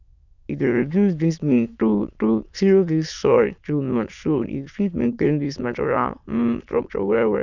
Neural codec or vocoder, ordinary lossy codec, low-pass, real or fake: autoencoder, 22.05 kHz, a latent of 192 numbers a frame, VITS, trained on many speakers; none; 7.2 kHz; fake